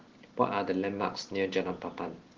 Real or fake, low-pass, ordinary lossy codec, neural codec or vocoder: real; 7.2 kHz; Opus, 16 kbps; none